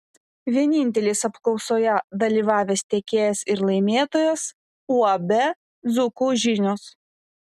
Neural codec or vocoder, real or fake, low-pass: none; real; 14.4 kHz